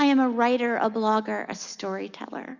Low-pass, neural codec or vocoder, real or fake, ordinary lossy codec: 7.2 kHz; none; real; Opus, 64 kbps